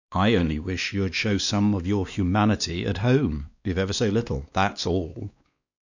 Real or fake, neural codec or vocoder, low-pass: fake; codec, 16 kHz, 2 kbps, X-Codec, WavLM features, trained on Multilingual LibriSpeech; 7.2 kHz